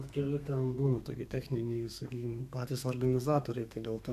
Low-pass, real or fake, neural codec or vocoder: 14.4 kHz; fake; codec, 32 kHz, 1.9 kbps, SNAC